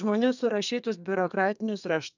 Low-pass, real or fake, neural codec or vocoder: 7.2 kHz; fake; codec, 32 kHz, 1.9 kbps, SNAC